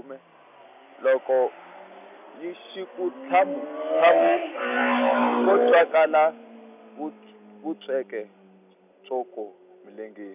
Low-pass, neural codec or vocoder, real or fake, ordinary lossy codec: 3.6 kHz; none; real; none